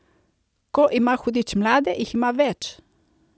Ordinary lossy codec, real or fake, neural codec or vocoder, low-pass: none; real; none; none